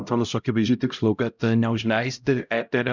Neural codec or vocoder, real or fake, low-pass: codec, 16 kHz, 0.5 kbps, X-Codec, HuBERT features, trained on LibriSpeech; fake; 7.2 kHz